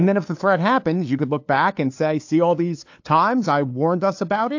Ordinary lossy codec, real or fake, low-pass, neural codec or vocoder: AAC, 48 kbps; fake; 7.2 kHz; codec, 16 kHz, 2 kbps, FunCodec, trained on LibriTTS, 25 frames a second